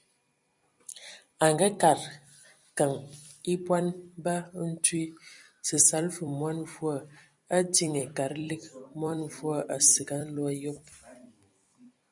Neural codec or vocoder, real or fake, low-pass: vocoder, 44.1 kHz, 128 mel bands every 256 samples, BigVGAN v2; fake; 10.8 kHz